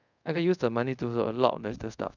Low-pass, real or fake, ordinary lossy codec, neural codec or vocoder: 7.2 kHz; fake; none; codec, 24 kHz, 0.5 kbps, DualCodec